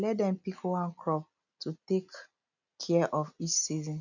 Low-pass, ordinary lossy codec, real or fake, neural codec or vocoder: 7.2 kHz; none; real; none